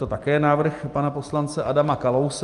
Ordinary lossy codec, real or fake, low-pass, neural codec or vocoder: Opus, 32 kbps; real; 10.8 kHz; none